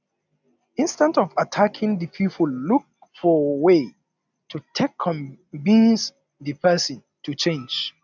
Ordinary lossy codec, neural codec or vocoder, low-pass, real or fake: none; none; 7.2 kHz; real